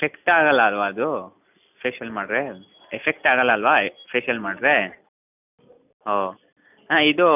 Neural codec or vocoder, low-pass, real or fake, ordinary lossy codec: none; 3.6 kHz; real; none